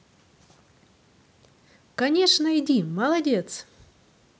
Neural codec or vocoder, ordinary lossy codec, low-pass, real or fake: none; none; none; real